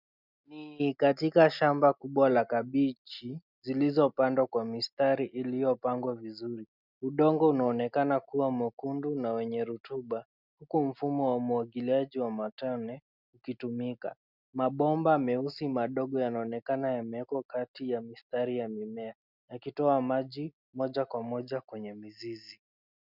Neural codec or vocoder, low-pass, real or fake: none; 5.4 kHz; real